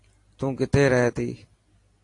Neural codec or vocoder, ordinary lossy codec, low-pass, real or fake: none; AAC, 48 kbps; 10.8 kHz; real